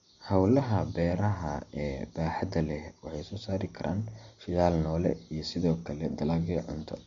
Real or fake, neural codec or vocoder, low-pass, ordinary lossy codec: real; none; 7.2 kHz; MP3, 48 kbps